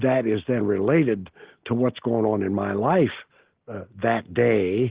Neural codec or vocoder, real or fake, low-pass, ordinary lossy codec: none; real; 3.6 kHz; Opus, 16 kbps